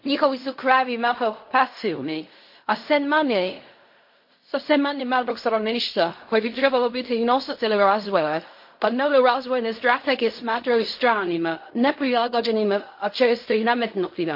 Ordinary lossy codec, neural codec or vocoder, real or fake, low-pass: MP3, 32 kbps; codec, 16 kHz in and 24 kHz out, 0.4 kbps, LongCat-Audio-Codec, fine tuned four codebook decoder; fake; 5.4 kHz